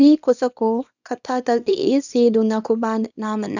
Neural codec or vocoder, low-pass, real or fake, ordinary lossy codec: codec, 24 kHz, 0.9 kbps, WavTokenizer, small release; 7.2 kHz; fake; none